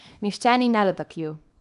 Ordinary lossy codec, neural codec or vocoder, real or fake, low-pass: none; codec, 24 kHz, 0.9 kbps, WavTokenizer, small release; fake; 10.8 kHz